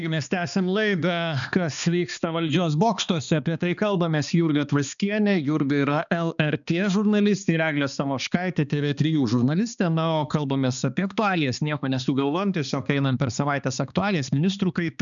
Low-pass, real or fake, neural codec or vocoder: 7.2 kHz; fake; codec, 16 kHz, 2 kbps, X-Codec, HuBERT features, trained on balanced general audio